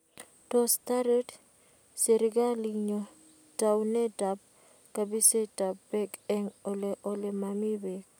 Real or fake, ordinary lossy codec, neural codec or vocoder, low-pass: real; none; none; none